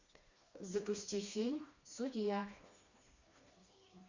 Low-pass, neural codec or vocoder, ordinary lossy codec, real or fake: 7.2 kHz; codec, 16 kHz, 2 kbps, FreqCodec, smaller model; Opus, 64 kbps; fake